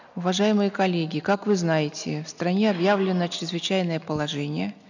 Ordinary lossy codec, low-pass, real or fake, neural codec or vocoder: none; 7.2 kHz; real; none